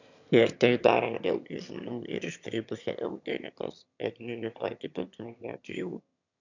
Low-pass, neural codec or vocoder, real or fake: 7.2 kHz; autoencoder, 22.05 kHz, a latent of 192 numbers a frame, VITS, trained on one speaker; fake